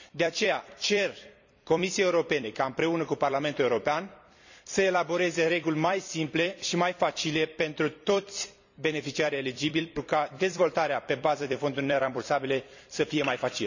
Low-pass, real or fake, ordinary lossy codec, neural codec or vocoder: 7.2 kHz; fake; none; vocoder, 44.1 kHz, 128 mel bands every 256 samples, BigVGAN v2